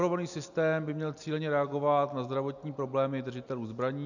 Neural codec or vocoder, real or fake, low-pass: none; real; 7.2 kHz